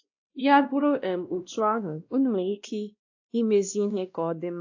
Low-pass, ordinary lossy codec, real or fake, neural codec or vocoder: 7.2 kHz; AAC, 48 kbps; fake; codec, 16 kHz, 1 kbps, X-Codec, WavLM features, trained on Multilingual LibriSpeech